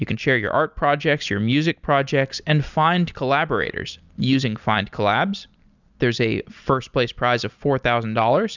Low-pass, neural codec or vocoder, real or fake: 7.2 kHz; none; real